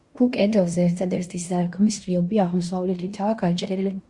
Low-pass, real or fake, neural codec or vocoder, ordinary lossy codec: 10.8 kHz; fake; codec, 16 kHz in and 24 kHz out, 0.9 kbps, LongCat-Audio-Codec, fine tuned four codebook decoder; Opus, 64 kbps